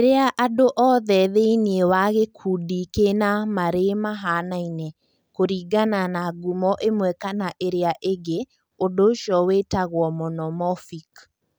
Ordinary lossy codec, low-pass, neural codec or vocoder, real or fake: none; none; none; real